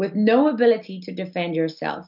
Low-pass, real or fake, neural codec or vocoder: 5.4 kHz; real; none